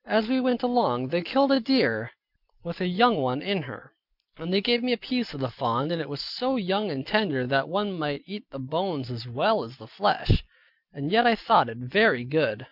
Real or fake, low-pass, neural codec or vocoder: real; 5.4 kHz; none